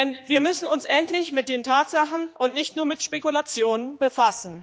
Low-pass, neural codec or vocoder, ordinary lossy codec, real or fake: none; codec, 16 kHz, 2 kbps, X-Codec, HuBERT features, trained on general audio; none; fake